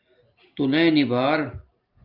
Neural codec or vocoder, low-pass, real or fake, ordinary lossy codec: none; 5.4 kHz; real; Opus, 32 kbps